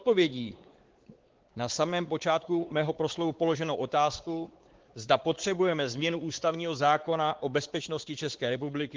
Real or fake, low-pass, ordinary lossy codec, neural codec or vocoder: fake; 7.2 kHz; Opus, 16 kbps; codec, 16 kHz, 4 kbps, X-Codec, WavLM features, trained on Multilingual LibriSpeech